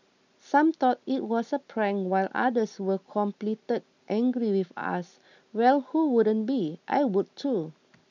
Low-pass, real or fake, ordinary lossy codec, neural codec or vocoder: 7.2 kHz; real; none; none